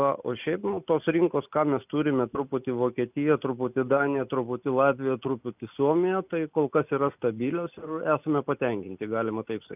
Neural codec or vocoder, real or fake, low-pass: none; real; 3.6 kHz